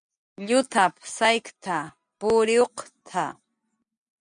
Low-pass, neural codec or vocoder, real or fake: 9.9 kHz; none; real